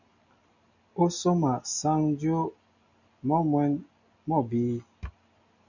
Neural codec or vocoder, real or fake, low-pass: none; real; 7.2 kHz